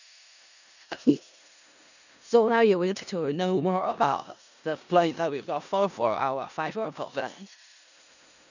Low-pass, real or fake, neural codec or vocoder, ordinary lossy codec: 7.2 kHz; fake; codec, 16 kHz in and 24 kHz out, 0.4 kbps, LongCat-Audio-Codec, four codebook decoder; none